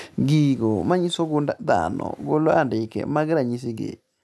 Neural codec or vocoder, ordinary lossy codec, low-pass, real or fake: none; none; none; real